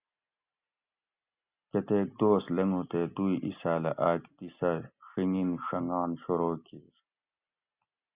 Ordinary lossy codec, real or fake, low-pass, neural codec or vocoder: Opus, 64 kbps; real; 3.6 kHz; none